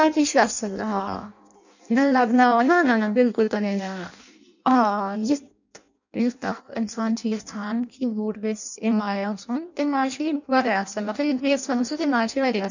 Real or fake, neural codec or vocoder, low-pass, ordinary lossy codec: fake; codec, 16 kHz in and 24 kHz out, 0.6 kbps, FireRedTTS-2 codec; 7.2 kHz; none